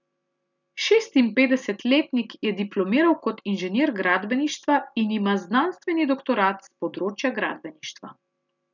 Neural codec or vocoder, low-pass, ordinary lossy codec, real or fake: none; 7.2 kHz; none; real